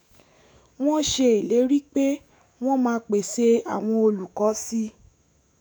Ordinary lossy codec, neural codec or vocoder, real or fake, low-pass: none; autoencoder, 48 kHz, 128 numbers a frame, DAC-VAE, trained on Japanese speech; fake; none